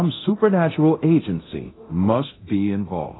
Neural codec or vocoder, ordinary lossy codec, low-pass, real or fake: codec, 24 kHz, 0.9 kbps, DualCodec; AAC, 16 kbps; 7.2 kHz; fake